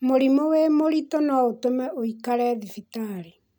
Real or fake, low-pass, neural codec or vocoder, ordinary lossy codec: real; none; none; none